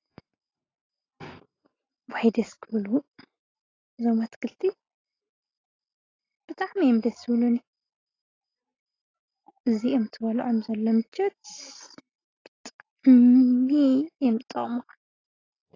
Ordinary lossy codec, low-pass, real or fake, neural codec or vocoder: AAC, 32 kbps; 7.2 kHz; real; none